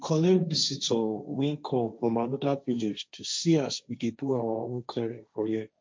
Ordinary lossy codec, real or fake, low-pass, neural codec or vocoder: none; fake; none; codec, 16 kHz, 1.1 kbps, Voila-Tokenizer